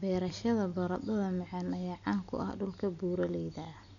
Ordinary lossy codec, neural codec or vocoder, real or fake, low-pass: none; none; real; 7.2 kHz